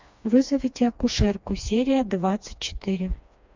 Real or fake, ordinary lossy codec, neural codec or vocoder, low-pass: fake; MP3, 64 kbps; codec, 16 kHz, 2 kbps, FreqCodec, smaller model; 7.2 kHz